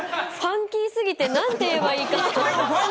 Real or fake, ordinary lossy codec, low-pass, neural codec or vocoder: real; none; none; none